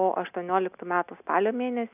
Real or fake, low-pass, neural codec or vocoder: real; 3.6 kHz; none